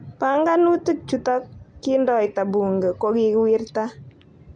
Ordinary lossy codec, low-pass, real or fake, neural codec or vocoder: MP3, 64 kbps; 9.9 kHz; real; none